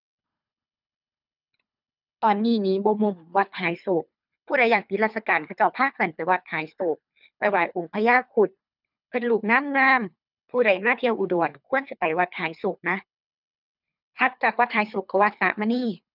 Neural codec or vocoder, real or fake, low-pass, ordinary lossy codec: codec, 24 kHz, 3 kbps, HILCodec; fake; 5.4 kHz; none